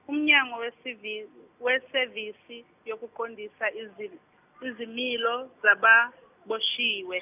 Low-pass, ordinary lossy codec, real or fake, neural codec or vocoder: 3.6 kHz; none; real; none